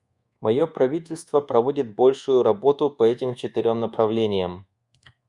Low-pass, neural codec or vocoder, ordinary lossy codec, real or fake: 10.8 kHz; codec, 24 kHz, 1.2 kbps, DualCodec; Opus, 64 kbps; fake